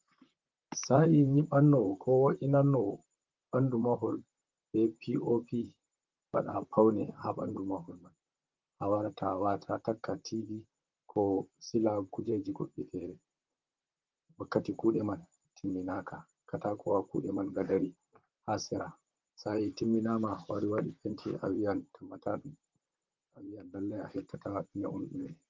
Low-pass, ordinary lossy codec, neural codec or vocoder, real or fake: 7.2 kHz; Opus, 32 kbps; vocoder, 44.1 kHz, 128 mel bands, Pupu-Vocoder; fake